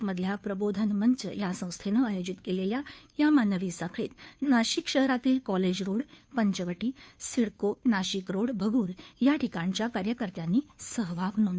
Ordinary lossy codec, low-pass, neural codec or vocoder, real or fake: none; none; codec, 16 kHz, 2 kbps, FunCodec, trained on Chinese and English, 25 frames a second; fake